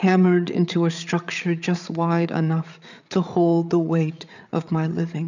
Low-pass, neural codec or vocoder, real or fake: 7.2 kHz; codec, 16 kHz, 8 kbps, FreqCodec, larger model; fake